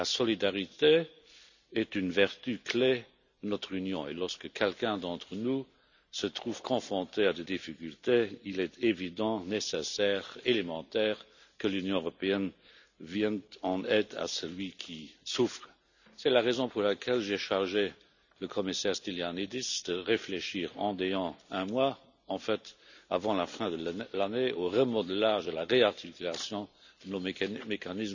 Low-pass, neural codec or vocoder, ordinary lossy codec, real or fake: 7.2 kHz; none; none; real